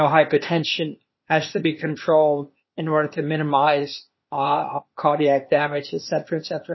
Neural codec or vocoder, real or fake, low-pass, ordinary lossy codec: codec, 16 kHz, 0.8 kbps, ZipCodec; fake; 7.2 kHz; MP3, 24 kbps